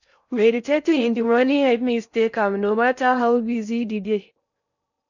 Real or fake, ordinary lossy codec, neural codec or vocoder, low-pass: fake; none; codec, 16 kHz in and 24 kHz out, 0.6 kbps, FocalCodec, streaming, 4096 codes; 7.2 kHz